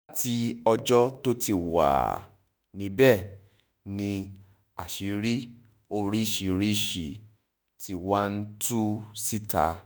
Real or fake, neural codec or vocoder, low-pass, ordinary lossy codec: fake; autoencoder, 48 kHz, 32 numbers a frame, DAC-VAE, trained on Japanese speech; none; none